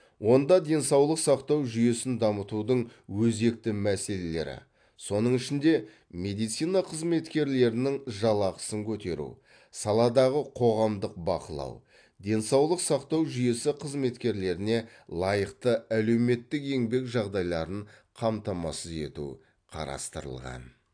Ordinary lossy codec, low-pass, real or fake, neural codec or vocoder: AAC, 64 kbps; 9.9 kHz; real; none